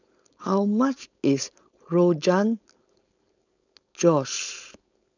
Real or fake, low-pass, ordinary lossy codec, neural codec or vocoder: fake; 7.2 kHz; none; codec, 16 kHz, 4.8 kbps, FACodec